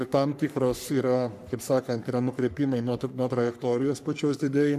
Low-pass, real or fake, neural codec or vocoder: 14.4 kHz; fake; codec, 44.1 kHz, 3.4 kbps, Pupu-Codec